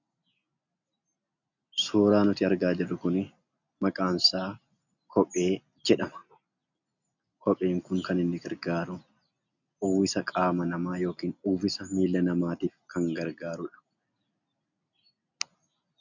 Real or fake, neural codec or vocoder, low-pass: real; none; 7.2 kHz